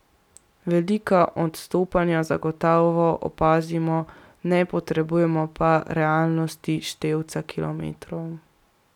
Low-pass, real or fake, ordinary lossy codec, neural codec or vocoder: 19.8 kHz; real; none; none